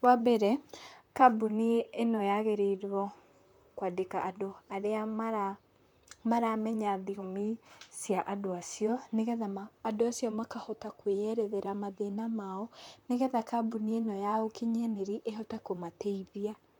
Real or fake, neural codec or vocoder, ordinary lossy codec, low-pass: fake; vocoder, 44.1 kHz, 128 mel bands, Pupu-Vocoder; MP3, 96 kbps; 19.8 kHz